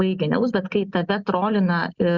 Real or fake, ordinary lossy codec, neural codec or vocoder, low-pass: real; Opus, 64 kbps; none; 7.2 kHz